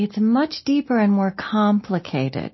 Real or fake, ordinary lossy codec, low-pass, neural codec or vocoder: real; MP3, 24 kbps; 7.2 kHz; none